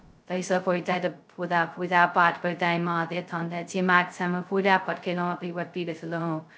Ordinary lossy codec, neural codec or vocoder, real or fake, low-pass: none; codec, 16 kHz, 0.2 kbps, FocalCodec; fake; none